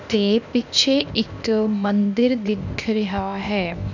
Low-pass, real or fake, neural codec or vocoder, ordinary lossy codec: 7.2 kHz; fake; codec, 16 kHz, 0.8 kbps, ZipCodec; none